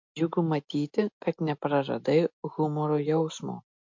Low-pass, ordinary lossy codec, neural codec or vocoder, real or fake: 7.2 kHz; MP3, 48 kbps; none; real